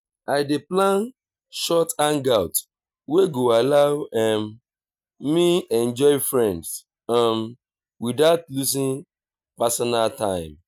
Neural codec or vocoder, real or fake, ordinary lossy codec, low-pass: none; real; none; 19.8 kHz